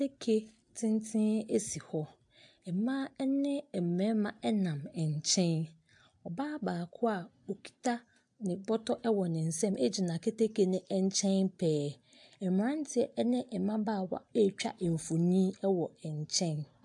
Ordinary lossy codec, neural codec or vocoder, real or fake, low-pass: AAC, 64 kbps; none; real; 10.8 kHz